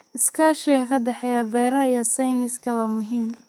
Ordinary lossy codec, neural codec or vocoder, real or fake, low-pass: none; codec, 44.1 kHz, 2.6 kbps, SNAC; fake; none